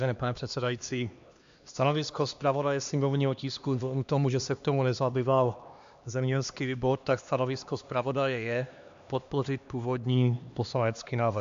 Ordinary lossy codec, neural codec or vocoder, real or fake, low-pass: MP3, 64 kbps; codec, 16 kHz, 2 kbps, X-Codec, HuBERT features, trained on LibriSpeech; fake; 7.2 kHz